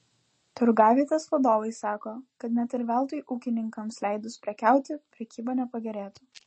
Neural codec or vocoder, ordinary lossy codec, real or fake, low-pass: none; MP3, 32 kbps; real; 10.8 kHz